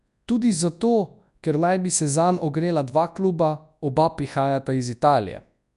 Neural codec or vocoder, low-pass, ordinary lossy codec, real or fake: codec, 24 kHz, 0.9 kbps, WavTokenizer, large speech release; 10.8 kHz; none; fake